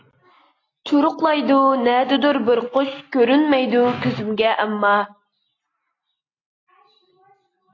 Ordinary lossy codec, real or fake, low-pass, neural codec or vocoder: AAC, 32 kbps; real; 7.2 kHz; none